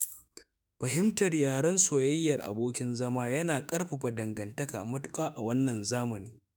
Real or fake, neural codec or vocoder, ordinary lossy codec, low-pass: fake; autoencoder, 48 kHz, 32 numbers a frame, DAC-VAE, trained on Japanese speech; none; none